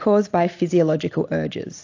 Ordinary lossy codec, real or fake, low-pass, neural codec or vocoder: AAC, 48 kbps; real; 7.2 kHz; none